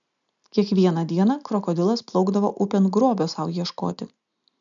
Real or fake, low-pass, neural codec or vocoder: real; 7.2 kHz; none